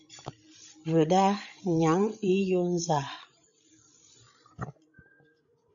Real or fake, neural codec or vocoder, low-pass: fake; codec, 16 kHz, 16 kbps, FreqCodec, larger model; 7.2 kHz